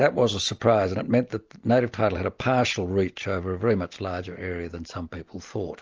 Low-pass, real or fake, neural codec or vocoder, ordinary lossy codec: 7.2 kHz; real; none; Opus, 24 kbps